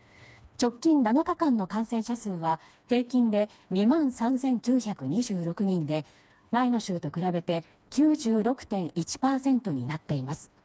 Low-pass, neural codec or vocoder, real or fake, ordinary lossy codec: none; codec, 16 kHz, 2 kbps, FreqCodec, smaller model; fake; none